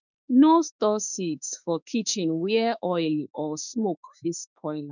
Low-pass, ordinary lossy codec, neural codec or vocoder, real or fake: 7.2 kHz; none; autoencoder, 48 kHz, 32 numbers a frame, DAC-VAE, trained on Japanese speech; fake